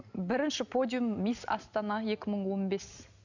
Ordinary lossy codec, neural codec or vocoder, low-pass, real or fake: none; none; 7.2 kHz; real